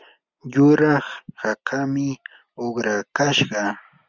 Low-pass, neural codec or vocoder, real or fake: 7.2 kHz; none; real